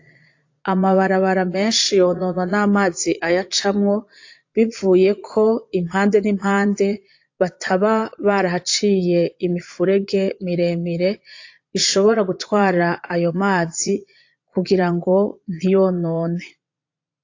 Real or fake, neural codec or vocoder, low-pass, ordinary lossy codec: fake; vocoder, 22.05 kHz, 80 mel bands, Vocos; 7.2 kHz; AAC, 48 kbps